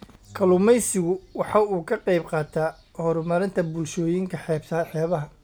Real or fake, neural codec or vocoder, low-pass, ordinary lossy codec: real; none; none; none